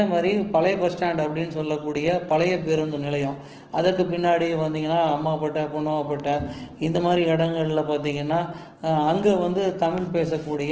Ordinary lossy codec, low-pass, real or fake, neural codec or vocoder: Opus, 16 kbps; 7.2 kHz; fake; autoencoder, 48 kHz, 128 numbers a frame, DAC-VAE, trained on Japanese speech